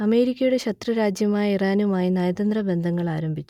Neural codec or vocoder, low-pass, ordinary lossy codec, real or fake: none; 19.8 kHz; none; real